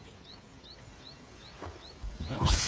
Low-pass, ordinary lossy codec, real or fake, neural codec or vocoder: none; none; fake; codec, 16 kHz, 8 kbps, FreqCodec, smaller model